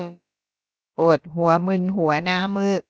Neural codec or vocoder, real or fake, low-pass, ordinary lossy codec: codec, 16 kHz, about 1 kbps, DyCAST, with the encoder's durations; fake; none; none